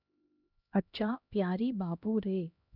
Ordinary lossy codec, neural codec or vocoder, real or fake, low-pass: none; codec, 16 kHz, 1 kbps, X-Codec, HuBERT features, trained on LibriSpeech; fake; 5.4 kHz